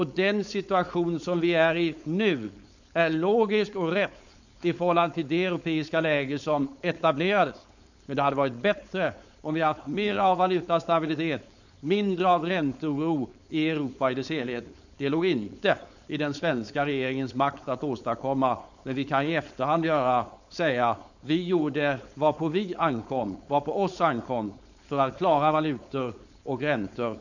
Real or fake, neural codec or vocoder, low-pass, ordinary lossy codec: fake; codec, 16 kHz, 4.8 kbps, FACodec; 7.2 kHz; none